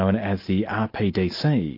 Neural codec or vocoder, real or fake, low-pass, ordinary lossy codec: none; real; 5.4 kHz; MP3, 32 kbps